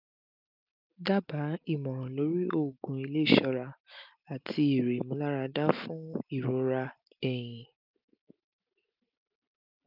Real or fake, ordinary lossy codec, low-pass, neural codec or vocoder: real; none; 5.4 kHz; none